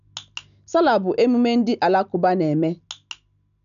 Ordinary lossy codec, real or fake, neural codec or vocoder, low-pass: none; real; none; 7.2 kHz